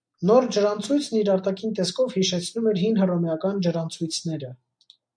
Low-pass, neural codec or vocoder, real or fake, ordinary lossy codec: 9.9 kHz; none; real; MP3, 64 kbps